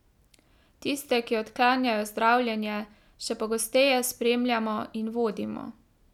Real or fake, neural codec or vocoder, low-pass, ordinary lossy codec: real; none; 19.8 kHz; none